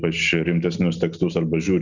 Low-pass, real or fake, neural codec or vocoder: 7.2 kHz; real; none